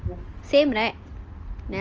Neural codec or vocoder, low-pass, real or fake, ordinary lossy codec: none; 7.2 kHz; real; Opus, 24 kbps